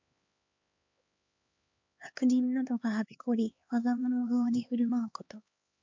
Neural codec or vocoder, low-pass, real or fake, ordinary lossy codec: codec, 16 kHz, 2 kbps, X-Codec, HuBERT features, trained on LibriSpeech; 7.2 kHz; fake; MP3, 48 kbps